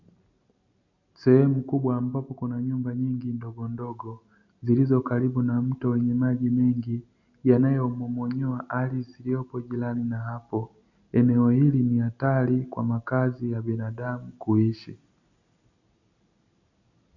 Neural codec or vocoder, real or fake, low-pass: none; real; 7.2 kHz